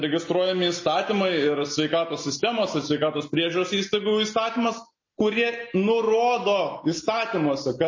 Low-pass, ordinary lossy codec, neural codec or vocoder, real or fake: 7.2 kHz; MP3, 32 kbps; none; real